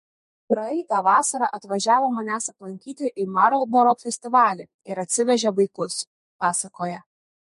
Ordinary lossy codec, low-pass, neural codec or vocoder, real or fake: MP3, 48 kbps; 14.4 kHz; codec, 32 kHz, 1.9 kbps, SNAC; fake